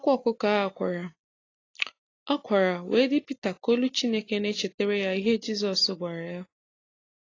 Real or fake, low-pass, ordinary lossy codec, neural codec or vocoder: real; 7.2 kHz; AAC, 32 kbps; none